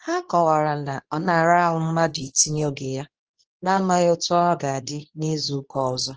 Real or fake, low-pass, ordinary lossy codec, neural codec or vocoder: fake; 7.2 kHz; Opus, 16 kbps; codec, 24 kHz, 0.9 kbps, WavTokenizer, medium speech release version 2